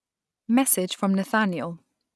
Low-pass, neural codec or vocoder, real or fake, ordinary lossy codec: none; none; real; none